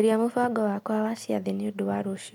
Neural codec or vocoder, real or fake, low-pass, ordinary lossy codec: none; real; 19.8 kHz; MP3, 96 kbps